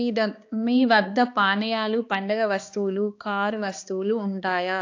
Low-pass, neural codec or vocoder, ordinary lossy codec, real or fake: 7.2 kHz; codec, 16 kHz, 2 kbps, X-Codec, HuBERT features, trained on balanced general audio; AAC, 48 kbps; fake